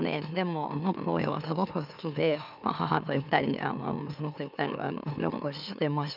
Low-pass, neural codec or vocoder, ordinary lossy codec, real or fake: 5.4 kHz; autoencoder, 44.1 kHz, a latent of 192 numbers a frame, MeloTTS; none; fake